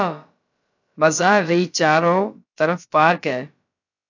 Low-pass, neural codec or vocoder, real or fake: 7.2 kHz; codec, 16 kHz, about 1 kbps, DyCAST, with the encoder's durations; fake